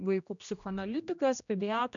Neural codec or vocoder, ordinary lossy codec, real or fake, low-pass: codec, 16 kHz, 1 kbps, X-Codec, HuBERT features, trained on general audio; MP3, 96 kbps; fake; 7.2 kHz